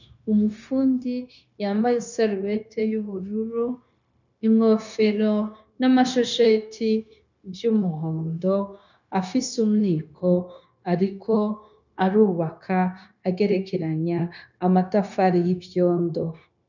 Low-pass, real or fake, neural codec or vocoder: 7.2 kHz; fake; codec, 16 kHz, 0.9 kbps, LongCat-Audio-Codec